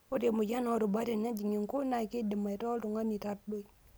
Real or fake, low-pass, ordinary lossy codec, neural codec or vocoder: real; none; none; none